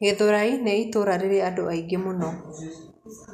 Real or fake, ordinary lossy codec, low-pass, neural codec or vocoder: real; none; 14.4 kHz; none